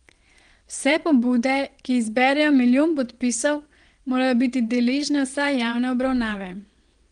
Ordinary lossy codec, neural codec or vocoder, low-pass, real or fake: Opus, 24 kbps; vocoder, 22.05 kHz, 80 mel bands, WaveNeXt; 9.9 kHz; fake